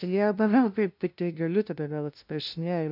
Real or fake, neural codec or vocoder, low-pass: fake; codec, 16 kHz, 0.5 kbps, FunCodec, trained on LibriTTS, 25 frames a second; 5.4 kHz